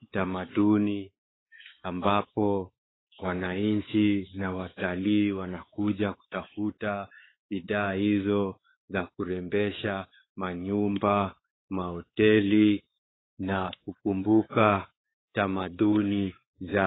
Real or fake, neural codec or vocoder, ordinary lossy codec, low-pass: fake; codec, 16 kHz, 4 kbps, X-Codec, WavLM features, trained on Multilingual LibriSpeech; AAC, 16 kbps; 7.2 kHz